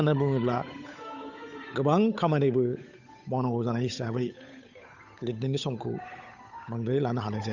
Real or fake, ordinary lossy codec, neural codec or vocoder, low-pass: fake; none; codec, 16 kHz, 8 kbps, FunCodec, trained on Chinese and English, 25 frames a second; 7.2 kHz